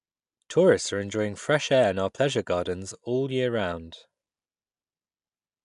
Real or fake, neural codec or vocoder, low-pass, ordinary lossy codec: real; none; 10.8 kHz; MP3, 96 kbps